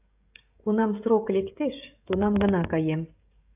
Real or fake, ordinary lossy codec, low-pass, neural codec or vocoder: fake; AAC, 32 kbps; 3.6 kHz; codec, 16 kHz, 16 kbps, FreqCodec, smaller model